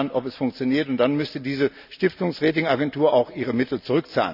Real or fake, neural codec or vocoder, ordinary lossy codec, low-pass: real; none; none; 5.4 kHz